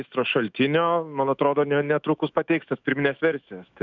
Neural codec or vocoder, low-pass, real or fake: autoencoder, 48 kHz, 128 numbers a frame, DAC-VAE, trained on Japanese speech; 7.2 kHz; fake